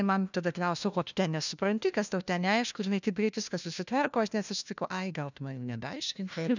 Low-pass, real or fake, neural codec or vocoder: 7.2 kHz; fake; codec, 16 kHz, 1 kbps, FunCodec, trained on LibriTTS, 50 frames a second